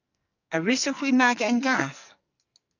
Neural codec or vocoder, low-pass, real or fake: codec, 44.1 kHz, 2.6 kbps, SNAC; 7.2 kHz; fake